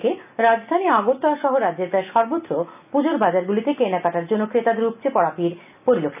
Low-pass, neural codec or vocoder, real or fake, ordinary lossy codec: 3.6 kHz; none; real; none